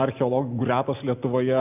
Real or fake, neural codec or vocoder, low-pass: real; none; 3.6 kHz